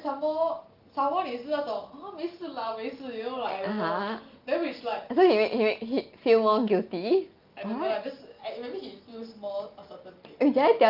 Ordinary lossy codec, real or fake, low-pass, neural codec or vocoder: Opus, 24 kbps; real; 5.4 kHz; none